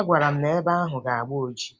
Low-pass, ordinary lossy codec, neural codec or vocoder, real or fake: none; none; none; real